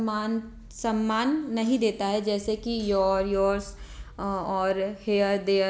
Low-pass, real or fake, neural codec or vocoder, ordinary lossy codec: none; real; none; none